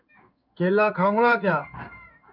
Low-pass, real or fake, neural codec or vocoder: 5.4 kHz; fake; codec, 16 kHz in and 24 kHz out, 1 kbps, XY-Tokenizer